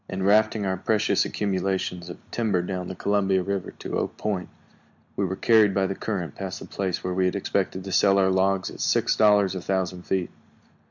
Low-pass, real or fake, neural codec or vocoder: 7.2 kHz; real; none